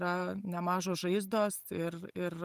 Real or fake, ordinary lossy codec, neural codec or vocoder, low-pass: real; Opus, 32 kbps; none; 14.4 kHz